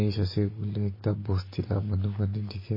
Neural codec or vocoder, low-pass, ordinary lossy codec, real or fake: none; 5.4 kHz; MP3, 24 kbps; real